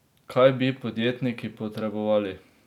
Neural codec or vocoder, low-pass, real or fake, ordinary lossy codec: none; 19.8 kHz; real; none